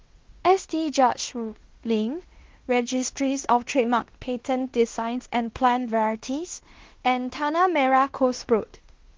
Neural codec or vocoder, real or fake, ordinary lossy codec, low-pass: codec, 16 kHz in and 24 kHz out, 0.9 kbps, LongCat-Audio-Codec, fine tuned four codebook decoder; fake; Opus, 16 kbps; 7.2 kHz